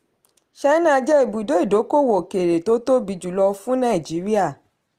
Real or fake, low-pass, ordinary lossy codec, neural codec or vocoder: real; 14.4 kHz; Opus, 24 kbps; none